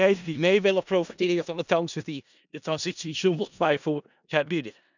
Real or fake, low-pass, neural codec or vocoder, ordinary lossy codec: fake; 7.2 kHz; codec, 16 kHz in and 24 kHz out, 0.4 kbps, LongCat-Audio-Codec, four codebook decoder; none